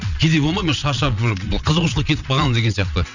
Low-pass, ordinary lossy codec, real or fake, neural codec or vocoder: 7.2 kHz; none; fake; vocoder, 22.05 kHz, 80 mel bands, WaveNeXt